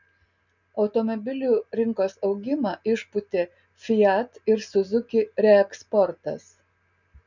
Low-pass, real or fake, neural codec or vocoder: 7.2 kHz; real; none